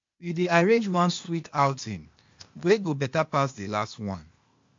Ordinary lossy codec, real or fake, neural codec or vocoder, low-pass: MP3, 48 kbps; fake; codec, 16 kHz, 0.8 kbps, ZipCodec; 7.2 kHz